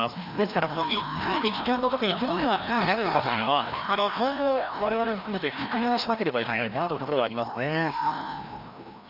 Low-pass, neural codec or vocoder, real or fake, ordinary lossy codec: 5.4 kHz; codec, 16 kHz, 1 kbps, FreqCodec, larger model; fake; none